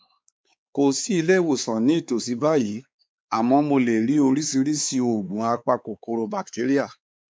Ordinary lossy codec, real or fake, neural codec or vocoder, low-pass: none; fake; codec, 16 kHz, 4 kbps, X-Codec, WavLM features, trained on Multilingual LibriSpeech; none